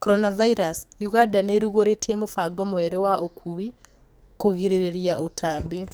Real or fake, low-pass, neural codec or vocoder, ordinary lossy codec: fake; none; codec, 44.1 kHz, 2.6 kbps, SNAC; none